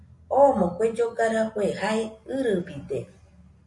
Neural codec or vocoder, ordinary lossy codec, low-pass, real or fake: none; MP3, 48 kbps; 10.8 kHz; real